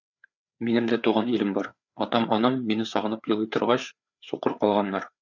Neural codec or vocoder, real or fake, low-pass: codec, 16 kHz, 4 kbps, FreqCodec, larger model; fake; 7.2 kHz